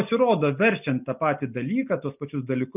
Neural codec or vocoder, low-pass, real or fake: none; 3.6 kHz; real